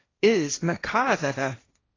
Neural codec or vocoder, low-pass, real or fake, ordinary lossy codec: codec, 16 kHz, 1.1 kbps, Voila-Tokenizer; 7.2 kHz; fake; AAC, 32 kbps